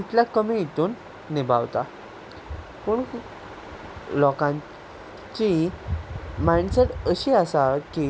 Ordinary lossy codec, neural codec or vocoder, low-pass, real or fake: none; none; none; real